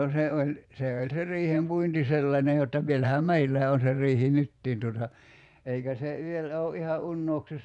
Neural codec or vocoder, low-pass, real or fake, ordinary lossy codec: vocoder, 24 kHz, 100 mel bands, Vocos; 10.8 kHz; fake; none